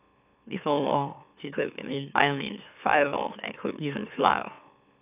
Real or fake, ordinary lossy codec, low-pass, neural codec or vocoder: fake; none; 3.6 kHz; autoencoder, 44.1 kHz, a latent of 192 numbers a frame, MeloTTS